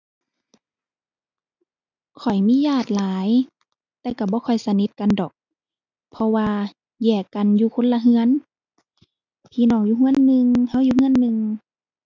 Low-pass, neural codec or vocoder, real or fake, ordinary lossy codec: 7.2 kHz; none; real; none